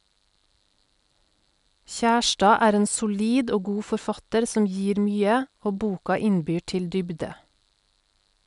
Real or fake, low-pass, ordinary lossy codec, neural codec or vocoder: real; 10.8 kHz; none; none